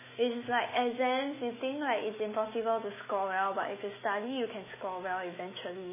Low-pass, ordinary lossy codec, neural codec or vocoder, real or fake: 3.6 kHz; MP3, 16 kbps; autoencoder, 48 kHz, 128 numbers a frame, DAC-VAE, trained on Japanese speech; fake